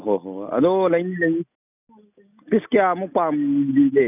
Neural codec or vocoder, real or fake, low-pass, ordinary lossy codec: none; real; 3.6 kHz; none